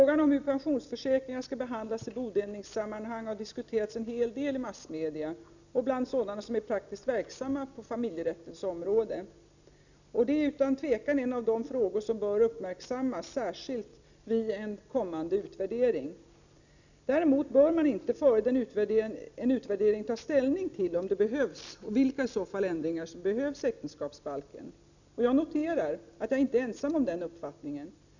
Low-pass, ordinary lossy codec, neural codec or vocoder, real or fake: 7.2 kHz; none; none; real